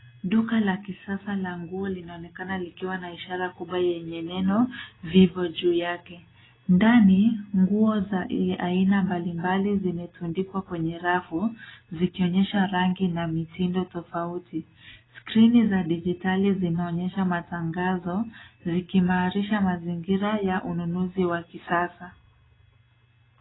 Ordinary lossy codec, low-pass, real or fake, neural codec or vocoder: AAC, 16 kbps; 7.2 kHz; real; none